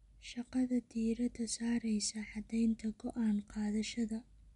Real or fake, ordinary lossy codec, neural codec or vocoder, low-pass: real; none; none; 10.8 kHz